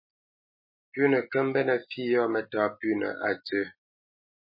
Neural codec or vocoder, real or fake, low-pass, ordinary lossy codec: none; real; 5.4 kHz; MP3, 24 kbps